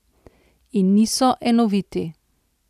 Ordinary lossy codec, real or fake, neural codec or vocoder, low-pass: none; real; none; 14.4 kHz